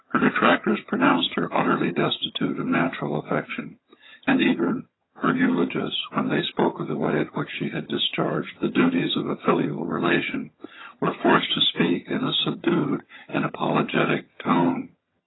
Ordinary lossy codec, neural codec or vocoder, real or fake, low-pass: AAC, 16 kbps; vocoder, 22.05 kHz, 80 mel bands, HiFi-GAN; fake; 7.2 kHz